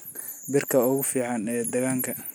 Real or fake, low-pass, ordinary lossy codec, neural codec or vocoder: real; none; none; none